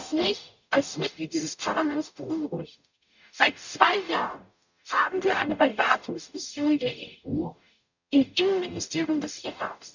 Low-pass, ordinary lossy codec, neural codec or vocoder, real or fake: 7.2 kHz; none; codec, 44.1 kHz, 0.9 kbps, DAC; fake